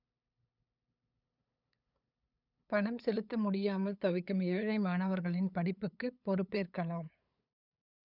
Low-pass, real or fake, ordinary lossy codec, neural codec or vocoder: 5.4 kHz; fake; none; codec, 16 kHz, 8 kbps, FunCodec, trained on LibriTTS, 25 frames a second